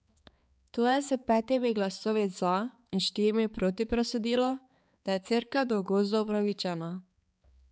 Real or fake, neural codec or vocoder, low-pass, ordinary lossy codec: fake; codec, 16 kHz, 4 kbps, X-Codec, HuBERT features, trained on balanced general audio; none; none